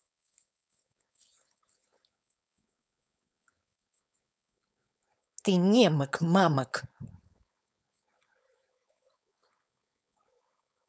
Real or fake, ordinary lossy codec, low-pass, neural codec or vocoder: fake; none; none; codec, 16 kHz, 4.8 kbps, FACodec